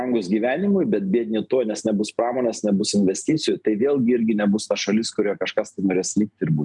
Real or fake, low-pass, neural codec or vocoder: real; 10.8 kHz; none